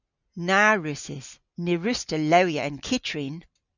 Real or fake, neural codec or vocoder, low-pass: real; none; 7.2 kHz